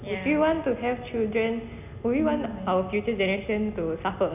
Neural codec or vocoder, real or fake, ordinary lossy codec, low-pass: none; real; none; 3.6 kHz